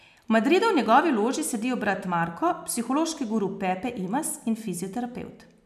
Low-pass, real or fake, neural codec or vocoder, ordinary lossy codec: 14.4 kHz; real; none; none